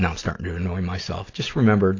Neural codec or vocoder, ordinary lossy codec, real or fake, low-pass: none; AAC, 32 kbps; real; 7.2 kHz